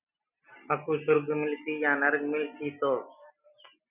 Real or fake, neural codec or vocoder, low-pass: real; none; 3.6 kHz